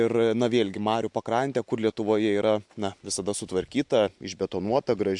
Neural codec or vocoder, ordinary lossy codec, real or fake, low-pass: none; MP3, 64 kbps; real; 10.8 kHz